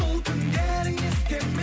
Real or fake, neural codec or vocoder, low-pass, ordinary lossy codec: real; none; none; none